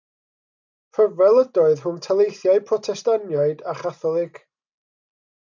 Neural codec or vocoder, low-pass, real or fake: none; 7.2 kHz; real